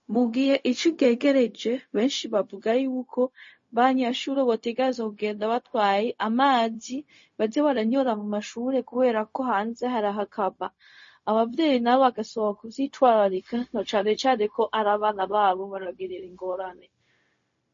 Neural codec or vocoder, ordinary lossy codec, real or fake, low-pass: codec, 16 kHz, 0.4 kbps, LongCat-Audio-Codec; MP3, 32 kbps; fake; 7.2 kHz